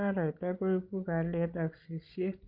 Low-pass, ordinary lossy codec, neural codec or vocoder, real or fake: 5.4 kHz; Opus, 24 kbps; codec, 16 kHz, 16 kbps, FreqCodec, larger model; fake